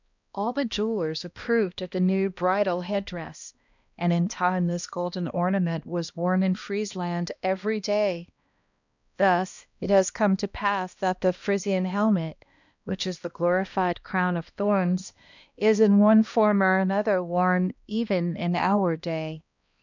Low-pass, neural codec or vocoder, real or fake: 7.2 kHz; codec, 16 kHz, 1 kbps, X-Codec, HuBERT features, trained on balanced general audio; fake